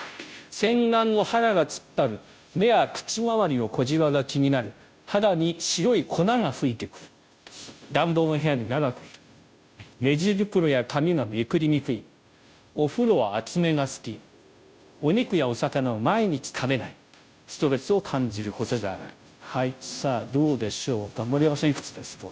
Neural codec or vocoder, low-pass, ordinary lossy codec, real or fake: codec, 16 kHz, 0.5 kbps, FunCodec, trained on Chinese and English, 25 frames a second; none; none; fake